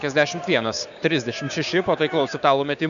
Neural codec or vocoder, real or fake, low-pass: codec, 16 kHz, 6 kbps, DAC; fake; 7.2 kHz